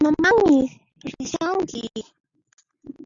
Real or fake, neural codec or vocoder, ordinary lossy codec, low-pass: real; none; Opus, 64 kbps; 7.2 kHz